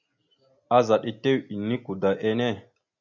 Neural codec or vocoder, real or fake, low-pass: none; real; 7.2 kHz